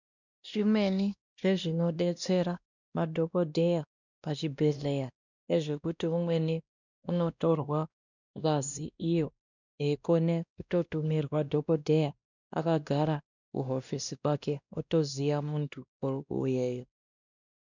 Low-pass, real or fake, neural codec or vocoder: 7.2 kHz; fake; codec, 16 kHz, 1 kbps, X-Codec, WavLM features, trained on Multilingual LibriSpeech